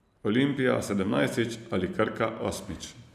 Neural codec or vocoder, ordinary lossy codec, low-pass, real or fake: vocoder, 44.1 kHz, 128 mel bands every 512 samples, BigVGAN v2; none; 14.4 kHz; fake